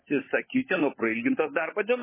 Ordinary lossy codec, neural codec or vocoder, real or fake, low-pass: MP3, 16 kbps; codec, 16 kHz, 16 kbps, FunCodec, trained on LibriTTS, 50 frames a second; fake; 3.6 kHz